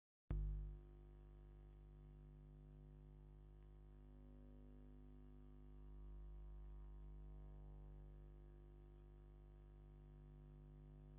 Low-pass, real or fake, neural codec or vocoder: 3.6 kHz; real; none